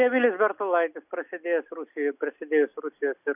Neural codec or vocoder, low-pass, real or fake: none; 3.6 kHz; real